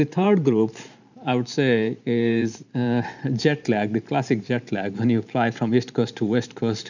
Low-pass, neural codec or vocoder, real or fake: 7.2 kHz; vocoder, 44.1 kHz, 128 mel bands every 512 samples, BigVGAN v2; fake